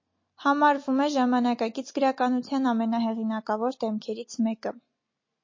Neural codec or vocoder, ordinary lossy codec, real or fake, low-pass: none; MP3, 32 kbps; real; 7.2 kHz